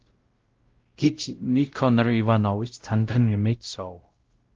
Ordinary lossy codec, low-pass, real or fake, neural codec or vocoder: Opus, 16 kbps; 7.2 kHz; fake; codec, 16 kHz, 0.5 kbps, X-Codec, WavLM features, trained on Multilingual LibriSpeech